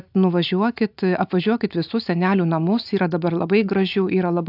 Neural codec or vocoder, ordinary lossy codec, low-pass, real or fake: none; AAC, 48 kbps; 5.4 kHz; real